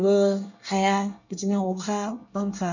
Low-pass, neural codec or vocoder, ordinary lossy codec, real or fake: 7.2 kHz; codec, 24 kHz, 1 kbps, SNAC; none; fake